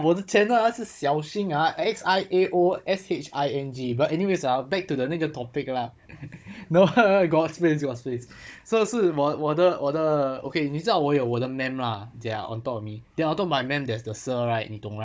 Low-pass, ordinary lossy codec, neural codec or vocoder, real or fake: none; none; codec, 16 kHz, 16 kbps, FunCodec, trained on Chinese and English, 50 frames a second; fake